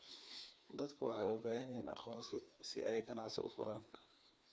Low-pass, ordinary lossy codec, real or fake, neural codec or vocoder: none; none; fake; codec, 16 kHz, 2 kbps, FreqCodec, larger model